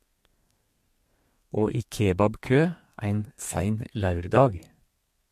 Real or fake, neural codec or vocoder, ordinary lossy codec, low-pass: fake; codec, 32 kHz, 1.9 kbps, SNAC; MP3, 64 kbps; 14.4 kHz